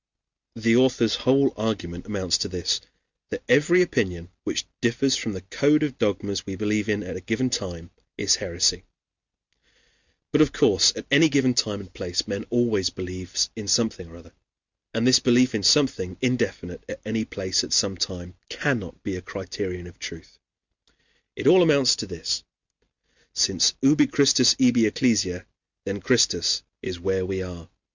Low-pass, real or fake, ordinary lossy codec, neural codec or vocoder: 7.2 kHz; real; Opus, 64 kbps; none